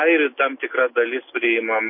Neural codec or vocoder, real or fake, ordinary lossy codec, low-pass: none; real; MP3, 24 kbps; 5.4 kHz